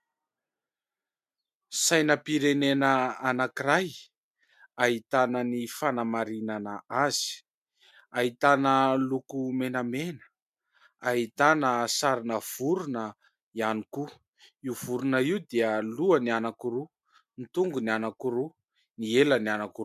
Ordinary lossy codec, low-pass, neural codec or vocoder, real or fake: MP3, 96 kbps; 14.4 kHz; none; real